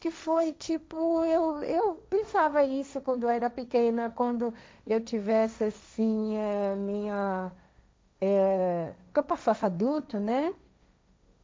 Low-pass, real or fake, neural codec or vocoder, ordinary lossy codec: none; fake; codec, 16 kHz, 1.1 kbps, Voila-Tokenizer; none